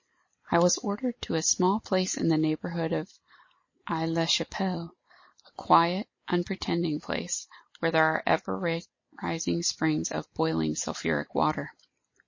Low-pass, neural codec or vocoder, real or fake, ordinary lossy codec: 7.2 kHz; none; real; MP3, 32 kbps